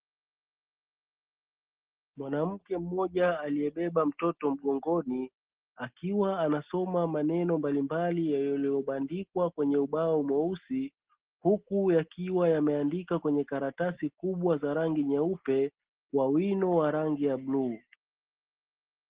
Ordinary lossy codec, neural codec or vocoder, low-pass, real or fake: Opus, 16 kbps; none; 3.6 kHz; real